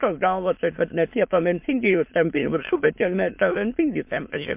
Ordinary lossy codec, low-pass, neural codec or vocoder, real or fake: MP3, 32 kbps; 3.6 kHz; autoencoder, 22.05 kHz, a latent of 192 numbers a frame, VITS, trained on many speakers; fake